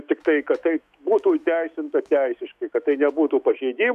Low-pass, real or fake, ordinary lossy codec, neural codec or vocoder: 14.4 kHz; fake; MP3, 96 kbps; autoencoder, 48 kHz, 128 numbers a frame, DAC-VAE, trained on Japanese speech